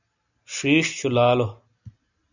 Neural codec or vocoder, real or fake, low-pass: none; real; 7.2 kHz